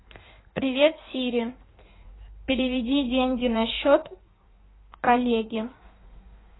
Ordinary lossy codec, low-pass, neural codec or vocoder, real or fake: AAC, 16 kbps; 7.2 kHz; codec, 16 kHz in and 24 kHz out, 1.1 kbps, FireRedTTS-2 codec; fake